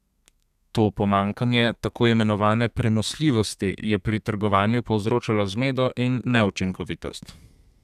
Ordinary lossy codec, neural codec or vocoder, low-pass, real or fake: none; codec, 32 kHz, 1.9 kbps, SNAC; 14.4 kHz; fake